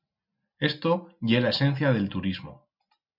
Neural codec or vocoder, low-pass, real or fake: none; 5.4 kHz; real